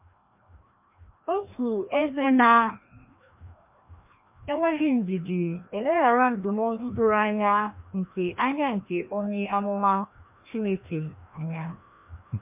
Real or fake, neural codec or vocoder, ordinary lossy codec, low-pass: fake; codec, 16 kHz, 1 kbps, FreqCodec, larger model; MP3, 32 kbps; 3.6 kHz